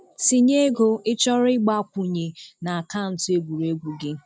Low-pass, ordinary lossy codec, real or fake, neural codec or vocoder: none; none; real; none